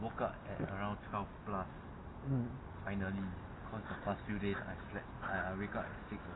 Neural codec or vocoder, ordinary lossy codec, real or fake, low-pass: none; AAC, 16 kbps; real; 7.2 kHz